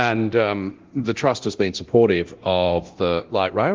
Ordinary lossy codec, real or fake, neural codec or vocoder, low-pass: Opus, 16 kbps; fake; codec, 24 kHz, 0.9 kbps, DualCodec; 7.2 kHz